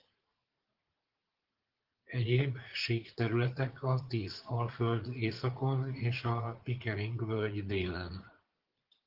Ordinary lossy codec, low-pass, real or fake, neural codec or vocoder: Opus, 16 kbps; 5.4 kHz; fake; vocoder, 44.1 kHz, 80 mel bands, Vocos